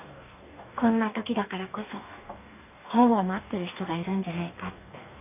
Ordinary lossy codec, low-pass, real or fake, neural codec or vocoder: none; 3.6 kHz; fake; codec, 44.1 kHz, 2.6 kbps, DAC